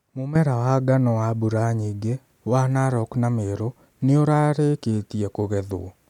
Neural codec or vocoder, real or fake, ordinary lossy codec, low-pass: none; real; none; 19.8 kHz